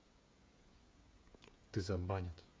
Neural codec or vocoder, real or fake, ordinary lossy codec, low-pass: codec, 16 kHz, 16 kbps, FreqCodec, smaller model; fake; none; none